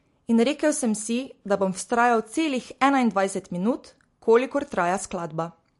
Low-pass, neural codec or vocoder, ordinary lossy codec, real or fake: 14.4 kHz; none; MP3, 48 kbps; real